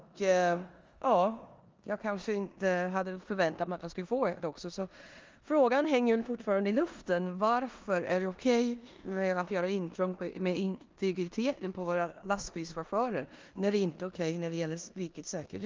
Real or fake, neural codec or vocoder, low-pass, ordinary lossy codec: fake; codec, 16 kHz in and 24 kHz out, 0.9 kbps, LongCat-Audio-Codec, four codebook decoder; 7.2 kHz; Opus, 32 kbps